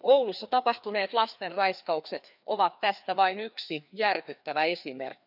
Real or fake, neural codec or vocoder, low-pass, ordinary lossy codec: fake; codec, 16 kHz, 2 kbps, FreqCodec, larger model; 5.4 kHz; none